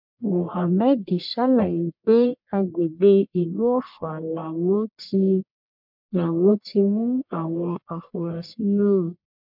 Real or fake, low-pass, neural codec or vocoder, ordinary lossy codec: fake; 5.4 kHz; codec, 44.1 kHz, 1.7 kbps, Pupu-Codec; MP3, 48 kbps